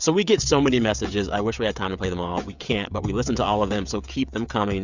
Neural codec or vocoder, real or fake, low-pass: codec, 16 kHz, 16 kbps, FreqCodec, smaller model; fake; 7.2 kHz